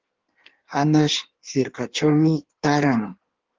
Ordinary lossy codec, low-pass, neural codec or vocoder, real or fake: Opus, 16 kbps; 7.2 kHz; codec, 16 kHz in and 24 kHz out, 1.1 kbps, FireRedTTS-2 codec; fake